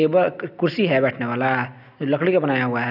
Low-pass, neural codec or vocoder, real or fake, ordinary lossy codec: 5.4 kHz; none; real; none